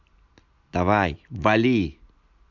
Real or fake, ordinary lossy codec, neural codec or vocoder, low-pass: real; MP3, 64 kbps; none; 7.2 kHz